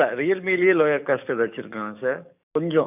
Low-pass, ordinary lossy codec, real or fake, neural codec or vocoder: 3.6 kHz; none; fake; codec, 44.1 kHz, 7.8 kbps, DAC